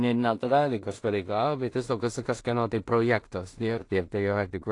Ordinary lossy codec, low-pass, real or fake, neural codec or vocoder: AAC, 48 kbps; 10.8 kHz; fake; codec, 16 kHz in and 24 kHz out, 0.4 kbps, LongCat-Audio-Codec, two codebook decoder